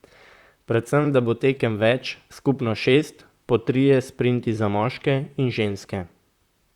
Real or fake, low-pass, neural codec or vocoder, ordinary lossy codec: fake; 19.8 kHz; vocoder, 44.1 kHz, 128 mel bands, Pupu-Vocoder; Opus, 64 kbps